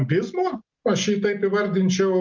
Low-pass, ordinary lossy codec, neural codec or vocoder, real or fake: 7.2 kHz; Opus, 24 kbps; none; real